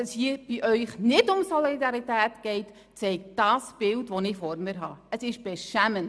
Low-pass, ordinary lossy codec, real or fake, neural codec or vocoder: none; none; real; none